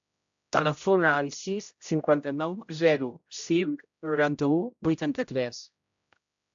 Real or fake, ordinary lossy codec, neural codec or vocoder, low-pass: fake; AAC, 64 kbps; codec, 16 kHz, 0.5 kbps, X-Codec, HuBERT features, trained on general audio; 7.2 kHz